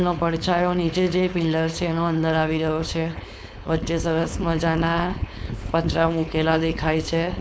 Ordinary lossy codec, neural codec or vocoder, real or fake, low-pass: none; codec, 16 kHz, 4.8 kbps, FACodec; fake; none